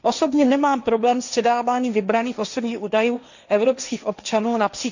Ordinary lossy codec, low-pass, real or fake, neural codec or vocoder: none; 7.2 kHz; fake; codec, 16 kHz, 1.1 kbps, Voila-Tokenizer